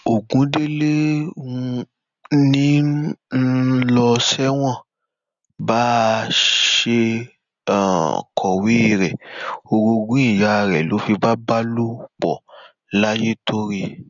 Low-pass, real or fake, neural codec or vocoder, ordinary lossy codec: 7.2 kHz; real; none; none